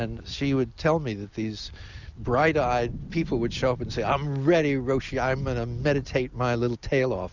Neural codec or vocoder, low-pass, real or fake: none; 7.2 kHz; real